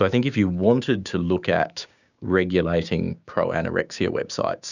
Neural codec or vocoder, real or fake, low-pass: codec, 16 kHz, 6 kbps, DAC; fake; 7.2 kHz